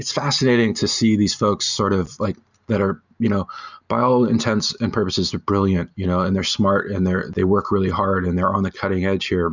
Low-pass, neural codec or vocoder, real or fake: 7.2 kHz; none; real